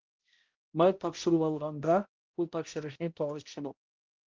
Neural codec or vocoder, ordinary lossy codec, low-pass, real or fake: codec, 16 kHz, 0.5 kbps, X-Codec, HuBERT features, trained on balanced general audio; Opus, 32 kbps; 7.2 kHz; fake